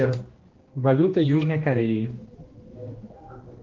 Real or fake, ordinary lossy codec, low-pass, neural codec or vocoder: fake; Opus, 16 kbps; 7.2 kHz; codec, 16 kHz, 1 kbps, X-Codec, HuBERT features, trained on general audio